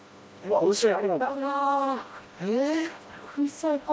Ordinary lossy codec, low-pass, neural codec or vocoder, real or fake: none; none; codec, 16 kHz, 1 kbps, FreqCodec, smaller model; fake